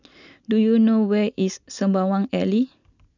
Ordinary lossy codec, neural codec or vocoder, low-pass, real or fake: none; none; 7.2 kHz; real